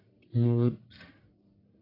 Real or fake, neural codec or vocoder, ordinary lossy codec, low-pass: fake; codec, 44.1 kHz, 1.7 kbps, Pupu-Codec; AAC, 24 kbps; 5.4 kHz